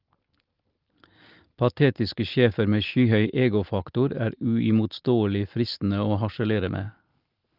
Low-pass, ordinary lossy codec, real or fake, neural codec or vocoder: 5.4 kHz; Opus, 32 kbps; real; none